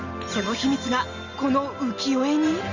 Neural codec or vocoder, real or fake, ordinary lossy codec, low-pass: none; real; Opus, 32 kbps; 7.2 kHz